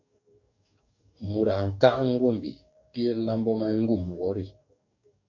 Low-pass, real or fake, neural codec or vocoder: 7.2 kHz; fake; codec, 44.1 kHz, 2.6 kbps, DAC